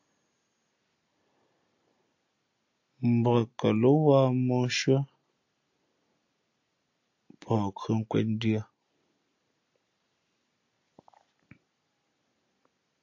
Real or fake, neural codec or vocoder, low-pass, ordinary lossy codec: real; none; 7.2 kHz; AAC, 48 kbps